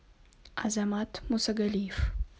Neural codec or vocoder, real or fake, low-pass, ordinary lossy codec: none; real; none; none